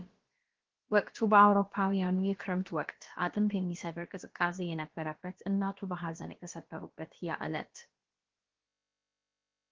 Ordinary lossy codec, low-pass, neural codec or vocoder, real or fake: Opus, 16 kbps; 7.2 kHz; codec, 16 kHz, about 1 kbps, DyCAST, with the encoder's durations; fake